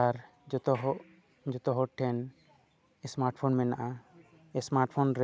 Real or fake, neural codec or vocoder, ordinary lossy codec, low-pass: real; none; none; none